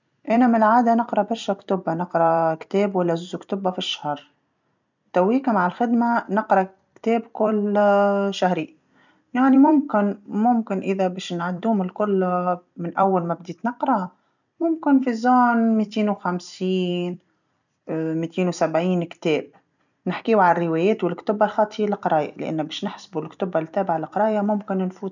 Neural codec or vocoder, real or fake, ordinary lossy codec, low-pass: vocoder, 44.1 kHz, 128 mel bands every 256 samples, BigVGAN v2; fake; none; 7.2 kHz